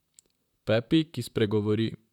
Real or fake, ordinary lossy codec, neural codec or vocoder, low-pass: fake; none; vocoder, 44.1 kHz, 128 mel bands, Pupu-Vocoder; 19.8 kHz